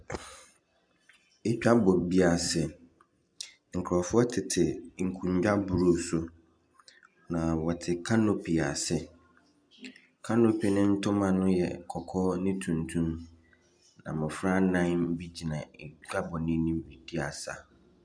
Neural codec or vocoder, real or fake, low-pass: none; real; 9.9 kHz